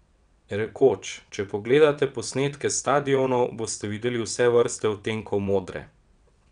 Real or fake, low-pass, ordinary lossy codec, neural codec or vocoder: fake; 9.9 kHz; none; vocoder, 22.05 kHz, 80 mel bands, WaveNeXt